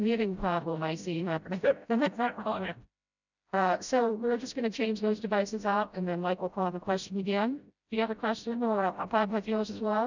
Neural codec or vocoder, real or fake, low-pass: codec, 16 kHz, 0.5 kbps, FreqCodec, smaller model; fake; 7.2 kHz